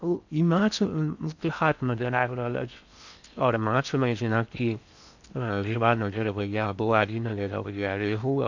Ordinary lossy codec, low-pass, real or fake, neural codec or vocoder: none; 7.2 kHz; fake; codec, 16 kHz in and 24 kHz out, 0.6 kbps, FocalCodec, streaming, 4096 codes